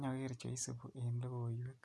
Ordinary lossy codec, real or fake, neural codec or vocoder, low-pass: none; real; none; none